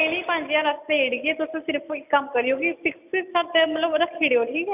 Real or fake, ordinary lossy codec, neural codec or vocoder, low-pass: real; none; none; 3.6 kHz